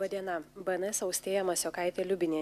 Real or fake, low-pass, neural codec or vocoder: real; 14.4 kHz; none